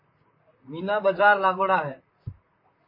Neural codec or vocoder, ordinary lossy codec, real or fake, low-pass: vocoder, 44.1 kHz, 128 mel bands, Pupu-Vocoder; MP3, 24 kbps; fake; 5.4 kHz